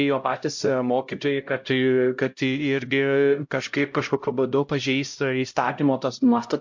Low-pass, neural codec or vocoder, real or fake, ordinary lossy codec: 7.2 kHz; codec, 16 kHz, 0.5 kbps, X-Codec, HuBERT features, trained on LibriSpeech; fake; MP3, 48 kbps